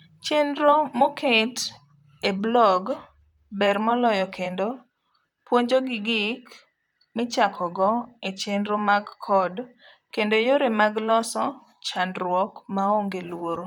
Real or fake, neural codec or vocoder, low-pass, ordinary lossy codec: fake; vocoder, 44.1 kHz, 128 mel bands, Pupu-Vocoder; 19.8 kHz; none